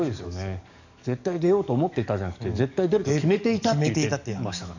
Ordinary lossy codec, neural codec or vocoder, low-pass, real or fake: none; codec, 44.1 kHz, 7.8 kbps, DAC; 7.2 kHz; fake